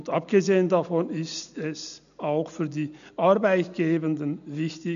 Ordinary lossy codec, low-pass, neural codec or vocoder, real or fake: none; 7.2 kHz; none; real